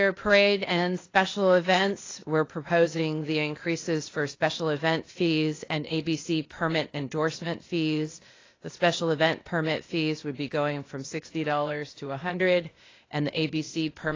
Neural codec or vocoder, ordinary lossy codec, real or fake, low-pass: codec, 16 kHz, 0.8 kbps, ZipCodec; AAC, 32 kbps; fake; 7.2 kHz